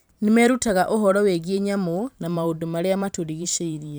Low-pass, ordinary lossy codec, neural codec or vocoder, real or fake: none; none; vocoder, 44.1 kHz, 128 mel bands every 512 samples, BigVGAN v2; fake